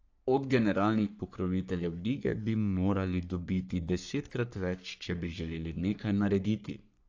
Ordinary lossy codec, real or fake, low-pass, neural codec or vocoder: AAC, 48 kbps; fake; 7.2 kHz; codec, 44.1 kHz, 3.4 kbps, Pupu-Codec